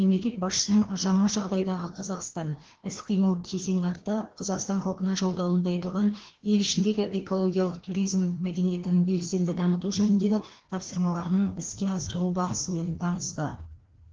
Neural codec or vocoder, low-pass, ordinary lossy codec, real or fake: codec, 16 kHz, 1 kbps, FreqCodec, larger model; 7.2 kHz; Opus, 16 kbps; fake